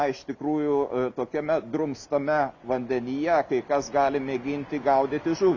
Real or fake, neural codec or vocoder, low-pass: real; none; 7.2 kHz